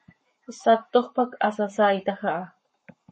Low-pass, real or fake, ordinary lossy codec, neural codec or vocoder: 10.8 kHz; fake; MP3, 32 kbps; codec, 44.1 kHz, 7.8 kbps, Pupu-Codec